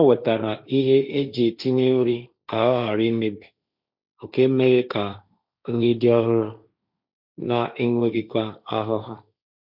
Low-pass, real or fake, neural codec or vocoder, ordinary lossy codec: 5.4 kHz; fake; codec, 16 kHz, 1.1 kbps, Voila-Tokenizer; none